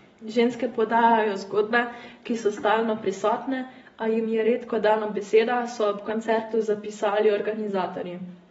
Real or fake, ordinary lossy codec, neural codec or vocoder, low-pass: real; AAC, 24 kbps; none; 19.8 kHz